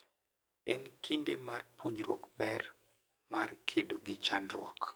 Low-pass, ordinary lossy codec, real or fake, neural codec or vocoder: none; none; fake; codec, 44.1 kHz, 2.6 kbps, SNAC